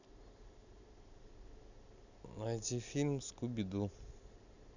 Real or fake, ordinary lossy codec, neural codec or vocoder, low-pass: real; none; none; 7.2 kHz